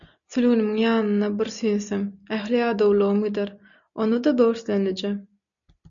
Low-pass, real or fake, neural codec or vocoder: 7.2 kHz; real; none